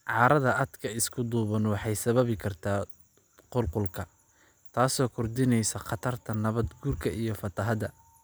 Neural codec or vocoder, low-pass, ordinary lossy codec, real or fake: none; none; none; real